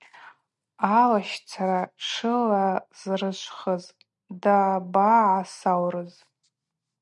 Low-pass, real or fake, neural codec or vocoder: 10.8 kHz; real; none